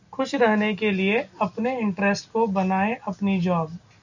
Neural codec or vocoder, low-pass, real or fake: none; 7.2 kHz; real